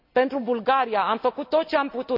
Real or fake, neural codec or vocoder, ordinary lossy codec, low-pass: real; none; none; 5.4 kHz